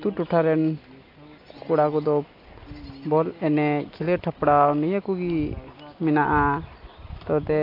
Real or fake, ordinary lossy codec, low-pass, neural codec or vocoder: real; AAC, 32 kbps; 5.4 kHz; none